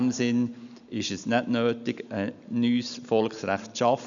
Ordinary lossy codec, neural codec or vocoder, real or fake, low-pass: none; none; real; 7.2 kHz